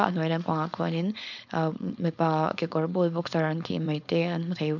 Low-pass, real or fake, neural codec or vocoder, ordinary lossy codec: 7.2 kHz; fake; codec, 16 kHz, 4.8 kbps, FACodec; none